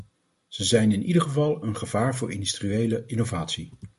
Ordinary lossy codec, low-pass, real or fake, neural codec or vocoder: MP3, 48 kbps; 14.4 kHz; real; none